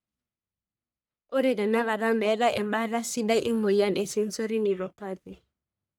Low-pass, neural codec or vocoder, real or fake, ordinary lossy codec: none; codec, 44.1 kHz, 1.7 kbps, Pupu-Codec; fake; none